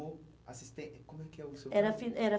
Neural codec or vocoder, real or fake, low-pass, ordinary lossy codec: none; real; none; none